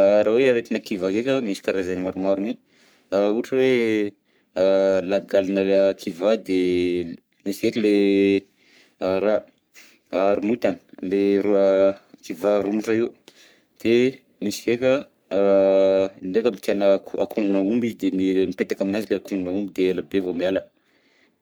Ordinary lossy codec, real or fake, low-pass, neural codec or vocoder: none; fake; none; codec, 44.1 kHz, 3.4 kbps, Pupu-Codec